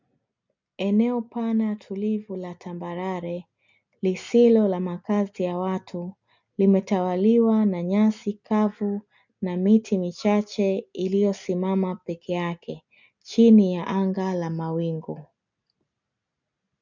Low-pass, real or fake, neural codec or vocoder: 7.2 kHz; real; none